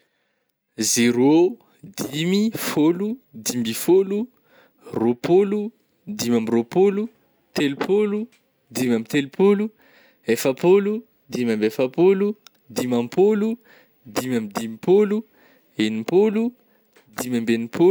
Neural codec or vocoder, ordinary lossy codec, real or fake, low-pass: none; none; real; none